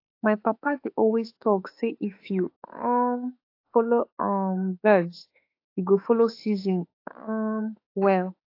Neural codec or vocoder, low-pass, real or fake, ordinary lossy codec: autoencoder, 48 kHz, 32 numbers a frame, DAC-VAE, trained on Japanese speech; 5.4 kHz; fake; AAC, 32 kbps